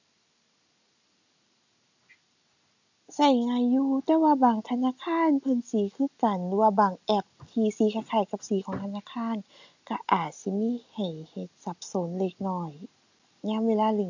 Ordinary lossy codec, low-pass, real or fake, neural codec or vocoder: AAC, 48 kbps; 7.2 kHz; real; none